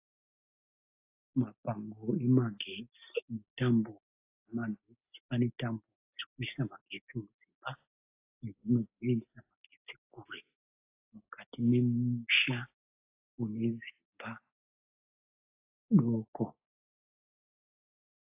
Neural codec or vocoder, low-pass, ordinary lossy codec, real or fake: none; 3.6 kHz; AAC, 32 kbps; real